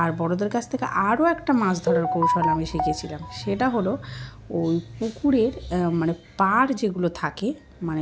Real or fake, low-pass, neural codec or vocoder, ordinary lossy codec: real; none; none; none